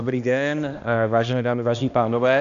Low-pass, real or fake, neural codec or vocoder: 7.2 kHz; fake; codec, 16 kHz, 1 kbps, X-Codec, HuBERT features, trained on balanced general audio